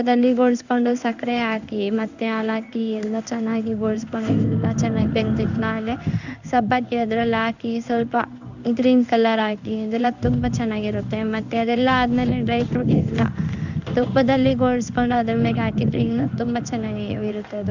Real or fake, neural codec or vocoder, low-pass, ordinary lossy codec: fake; codec, 16 kHz in and 24 kHz out, 1 kbps, XY-Tokenizer; 7.2 kHz; none